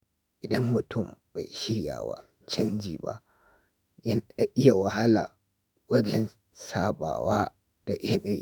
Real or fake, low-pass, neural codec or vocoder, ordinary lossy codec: fake; none; autoencoder, 48 kHz, 32 numbers a frame, DAC-VAE, trained on Japanese speech; none